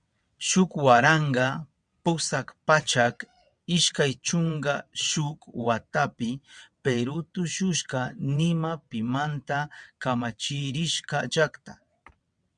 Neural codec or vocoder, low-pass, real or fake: vocoder, 22.05 kHz, 80 mel bands, WaveNeXt; 9.9 kHz; fake